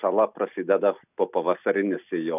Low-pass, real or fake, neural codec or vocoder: 3.6 kHz; real; none